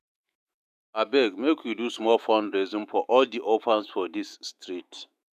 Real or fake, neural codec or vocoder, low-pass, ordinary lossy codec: fake; autoencoder, 48 kHz, 128 numbers a frame, DAC-VAE, trained on Japanese speech; 14.4 kHz; none